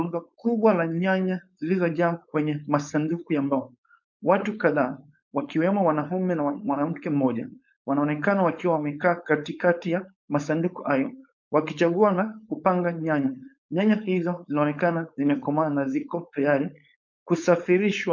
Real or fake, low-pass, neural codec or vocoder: fake; 7.2 kHz; codec, 16 kHz, 4.8 kbps, FACodec